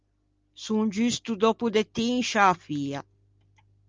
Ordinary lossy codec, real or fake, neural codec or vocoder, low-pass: Opus, 32 kbps; real; none; 7.2 kHz